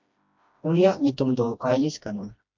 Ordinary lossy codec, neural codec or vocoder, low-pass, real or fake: MP3, 48 kbps; codec, 16 kHz, 1 kbps, FreqCodec, smaller model; 7.2 kHz; fake